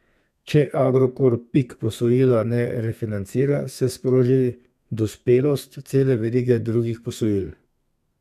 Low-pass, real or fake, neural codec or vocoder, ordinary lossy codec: 14.4 kHz; fake; codec, 32 kHz, 1.9 kbps, SNAC; none